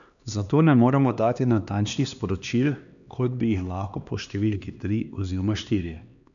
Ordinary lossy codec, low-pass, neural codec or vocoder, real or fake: none; 7.2 kHz; codec, 16 kHz, 2 kbps, X-Codec, HuBERT features, trained on LibriSpeech; fake